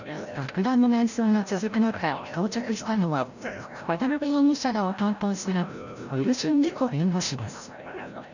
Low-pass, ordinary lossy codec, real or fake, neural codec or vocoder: 7.2 kHz; none; fake; codec, 16 kHz, 0.5 kbps, FreqCodec, larger model